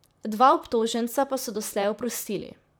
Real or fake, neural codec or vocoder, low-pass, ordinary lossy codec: fake; vocoder, 44.1 kHz, 128 mel bands, Pupu-Vocoder; none; none